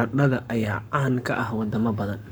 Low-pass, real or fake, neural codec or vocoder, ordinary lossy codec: none; fake; codec, 44.1 kHz, 7.8 kbps, Pupu-Codec; none